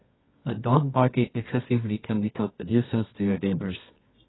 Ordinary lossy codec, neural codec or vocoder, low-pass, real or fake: AAC, 16 kbps; codec, 24 kHz, 0.9 kbps, WavTokenizer, medium music audio release; 7.2 kHz; fake